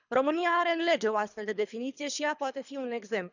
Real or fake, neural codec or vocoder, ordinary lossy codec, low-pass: fake; codec, 24 kHz, 3 kbps, HILCodec; none; 7.2 kHz